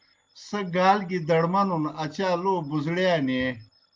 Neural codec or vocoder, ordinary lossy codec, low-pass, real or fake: none; Opus, 32 kbps; 7.2 kHz; real